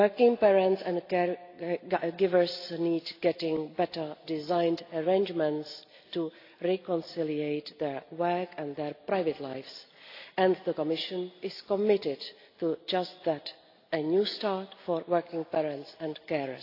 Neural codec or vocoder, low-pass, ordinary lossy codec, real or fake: none; 5.4 kHz; AAC, 32 kbps; real